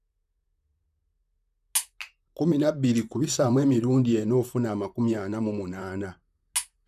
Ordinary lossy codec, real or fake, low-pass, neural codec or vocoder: none; fake; 14.4 kHz; vocoder, 44.1 kHz, 128 mel bands, Pupu-Vocoder